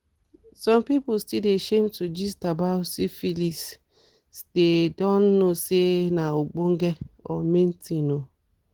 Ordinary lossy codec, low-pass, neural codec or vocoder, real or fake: Opus, 16 kbps; 19.8 kHz; none; real